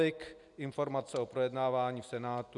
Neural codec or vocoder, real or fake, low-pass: none; real; 10.8 kHz